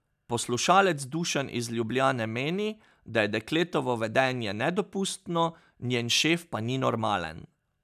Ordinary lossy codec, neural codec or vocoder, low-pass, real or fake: none; none; 14.4 kHz; real